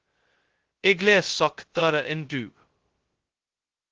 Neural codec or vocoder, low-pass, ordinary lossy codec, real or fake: codec, 16 kHz, 0.2 kbps, FocalCodec; 7.2 kHz; Opus, 16 kbps; fake